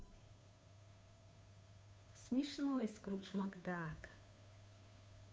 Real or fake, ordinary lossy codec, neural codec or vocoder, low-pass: fake; none; codec, 16 kHz, 2 kbps, FunCodec, trained on Chinese and English, 25 frames a second; none